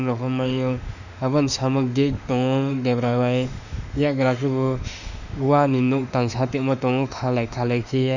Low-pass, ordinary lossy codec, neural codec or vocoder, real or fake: 7.2 kHz; none; autoencoder, 48 kHz, 32 numbers a frame, DAC-VAE, trained on Japanese speech; fake